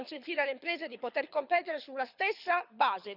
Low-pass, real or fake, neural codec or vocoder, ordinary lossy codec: 5.4 kHz; fake; codec, 24 kHz, 6 kbps, HILCodec; none